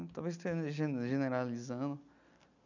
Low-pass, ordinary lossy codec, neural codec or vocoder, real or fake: 7.2 kHz; none; none; real